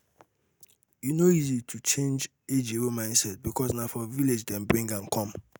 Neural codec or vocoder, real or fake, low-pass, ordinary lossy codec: none; real; none; none